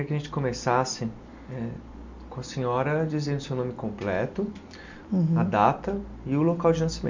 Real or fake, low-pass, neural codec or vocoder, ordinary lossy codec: real; 7.2 kHz; none; MP3, 64 kbps